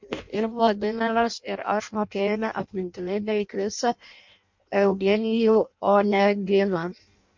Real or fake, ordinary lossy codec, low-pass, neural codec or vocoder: fake; MP3, 48 kbps; 7.2 kHz; codec, 16 kHz in and 24 kHz out, 0.6 kbps, FireRedTTS-2 codec